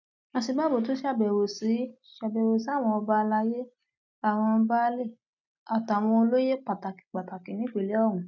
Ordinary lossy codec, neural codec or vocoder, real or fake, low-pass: none; none; real; 7.2 kHz